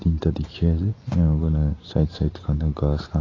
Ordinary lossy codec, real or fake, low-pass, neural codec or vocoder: AAC, 32 kbps; real; 7.2 kHz; none